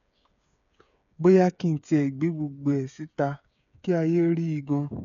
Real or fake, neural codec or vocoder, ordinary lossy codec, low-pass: fake; codec, 16 kHz, 8 kbps, FreqCodec, smaller model; none; 7.2 kHz